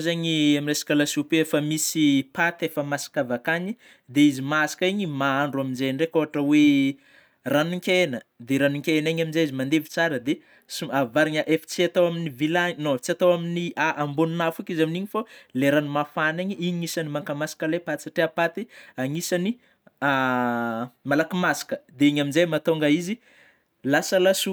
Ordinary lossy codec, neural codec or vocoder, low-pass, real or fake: none; none; none; real